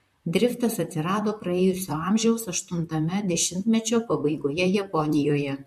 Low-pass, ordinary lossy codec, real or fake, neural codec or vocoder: 14.4 kHz; MP3, 64 kbps; fake; vocoder, 44.1 kHz, 128 mel bands, Pupu-Vocoder